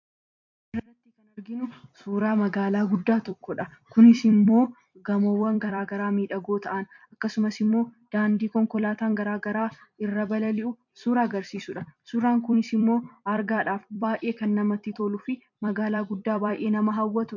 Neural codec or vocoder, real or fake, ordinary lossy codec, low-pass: vocoder, 44.1 kHz, 128 mel bands every 256 samples, BigVGAN v2; fake; MP3, 48 kbps; 7.2 kHz